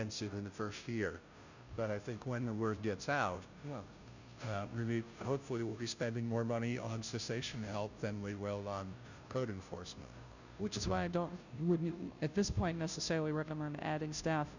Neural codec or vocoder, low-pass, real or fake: codec, 16 kHz, 0.5 kbps, FunCodec, trained on Chinese and English, 25 frames a second; 7.2 kHz; fake